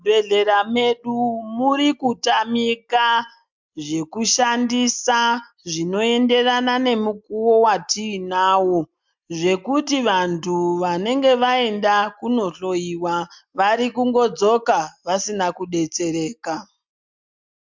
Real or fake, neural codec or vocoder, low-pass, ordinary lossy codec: real; none; 7.2 kHz; MP3, 64 kbps